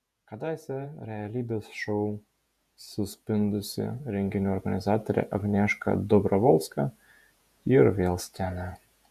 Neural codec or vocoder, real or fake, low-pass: vocoder, 48 kHz, 128 mel bands, Vocos; fake; 14.4 kHz